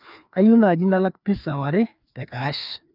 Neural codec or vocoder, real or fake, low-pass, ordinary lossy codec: codec, 16 kHz, 2 kbps, FreqCodec, larger model; fake; 5.4 kHz; none